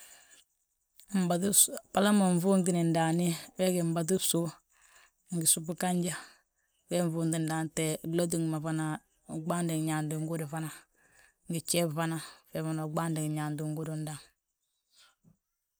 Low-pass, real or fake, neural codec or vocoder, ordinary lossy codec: none; real; none; none